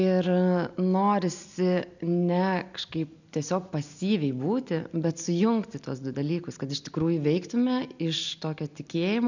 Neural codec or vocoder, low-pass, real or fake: none; 7.2 kHz; real